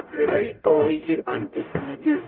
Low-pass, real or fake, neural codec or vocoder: 5.4 kHz; fake; codec, 44.1 kHz, 0.9 kbps, DAC